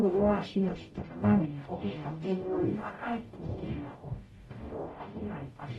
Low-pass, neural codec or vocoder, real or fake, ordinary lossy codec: 19.8 kHz; codec, 44.1 kHz, 0.9 kbps, DAC; fake; AAC, 32 kbps